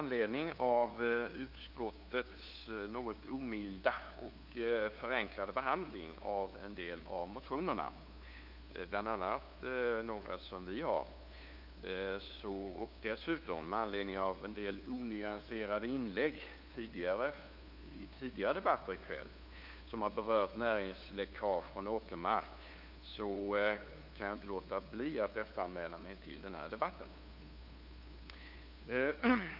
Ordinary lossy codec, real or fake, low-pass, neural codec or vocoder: none; fake; 5.4 kHz; codec, 16 kHz, 2 kbps, FunCodec, trained on LibriTTS, 25 frames a second